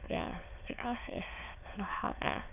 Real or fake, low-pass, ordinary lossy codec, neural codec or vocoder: fake; 3.6 kHz; none; autoencoder, 22.05 kHz, a latent of 192 numbers a frame, VITS, trained on many speakers